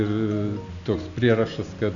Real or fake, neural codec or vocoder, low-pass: real; none; 7.2 kHz